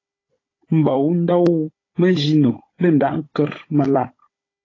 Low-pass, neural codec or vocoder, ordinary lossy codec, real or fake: 7.2 kHz; codec, 16 kHz, 16 kbps, FunCodec, trained on Chinese and English, 50 frames a second; AAC, 32 kbps; fake